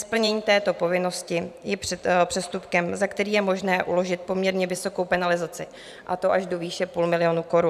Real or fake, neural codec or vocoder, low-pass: fake; vocoder, 44.1 kHz, 128 mel bands every 512 samples, BigVGAN v2; 14.4 kHz